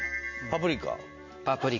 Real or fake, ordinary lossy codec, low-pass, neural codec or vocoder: real; MP3, 48 kbps; 7.2 kHz; none